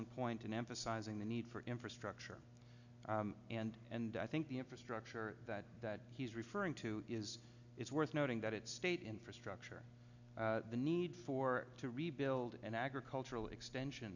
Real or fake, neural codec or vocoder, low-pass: real; none; 7.2 kHz